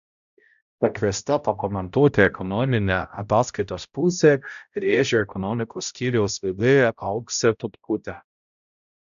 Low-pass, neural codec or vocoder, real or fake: 7.2 kHz; codec, 16 kHz, 0.5 kbps, X-Codec, HuBERT features, trained on balanced general audio; fake